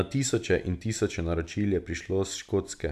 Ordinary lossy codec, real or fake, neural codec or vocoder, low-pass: none; real; none; 14.4 kHz